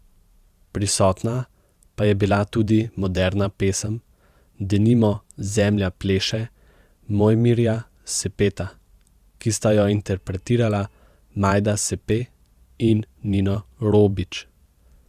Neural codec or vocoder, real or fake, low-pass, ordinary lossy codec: vocoder, 44.1 kHz, 128 mel bands every 512 samples, BigVGAN v2; fake; 14.4 kHz; Opus, 64 kbps